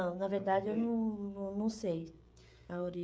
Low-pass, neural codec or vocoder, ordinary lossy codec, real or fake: none; codec, 16 kHz, 16 kbps, FreqCodec, smaller model; none; fake